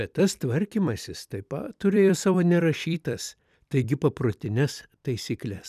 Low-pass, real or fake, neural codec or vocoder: 14.4 kHz; fake; vocoder, 44.1 kHz, 128 mel bands every 256 samples, BigVGAN v2